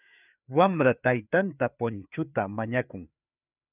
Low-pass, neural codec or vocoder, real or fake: 3.6 kHz; codec, 16 kHz, 4 kbps, FreqCodec, larger model; fake